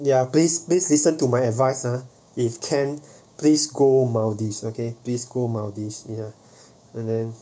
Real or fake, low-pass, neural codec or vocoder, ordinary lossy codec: fake; none; codec, 16 kHz, 6 kbps, DAC; none